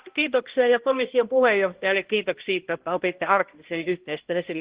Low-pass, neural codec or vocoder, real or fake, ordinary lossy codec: 3.6 kHz; codec, 16 kHz, 1 kbps, X-Codec, HuBERT features, trained on balanced general audio; fake; Opus, 16 kbps